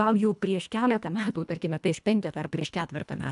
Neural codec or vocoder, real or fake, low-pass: codec, 24 kHz, 1.5 kbps, HILCodec; fake; 10.8 kHz